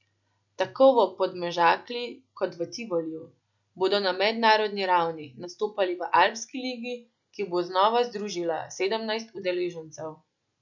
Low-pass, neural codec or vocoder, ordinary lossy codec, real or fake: 7.2 kHz; none; none; real